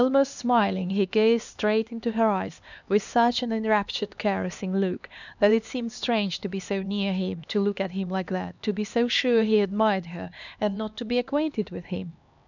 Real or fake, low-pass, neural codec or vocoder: fake; 7.2 kHz; codec, 16 kHz, 2 kbps, X-Codec, HuBERT features, trained on LibriSpeech